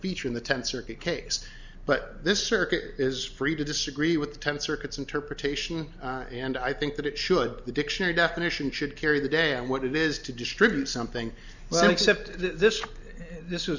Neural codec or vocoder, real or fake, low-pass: none; real; 7.2 kHz